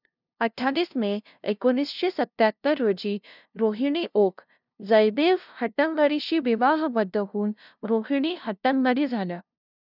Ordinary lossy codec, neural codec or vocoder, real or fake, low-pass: none; codec, 16 kHz, 0.5 kbps, FunCodec, trained on LibriTTS, 25 frames a second; fake; 5.4 kHz